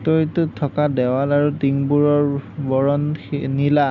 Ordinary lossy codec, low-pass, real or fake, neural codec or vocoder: none; 7.2 kHz; real; none